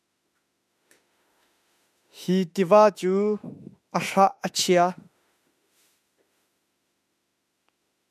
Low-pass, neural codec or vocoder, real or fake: 14.4 kHz; autoencoder, 48 kHz, 32 numbers a frame, DAC-VAE, trained on Japanese speech; fake